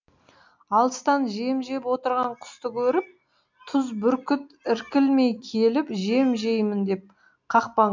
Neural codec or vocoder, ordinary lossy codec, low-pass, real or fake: none; MP3, 64 kbps; 7.2 kHz; real